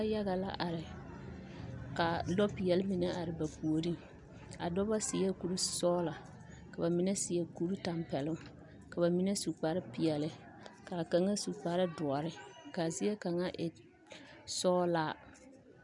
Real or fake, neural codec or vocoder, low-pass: real; none; 10.8 kHz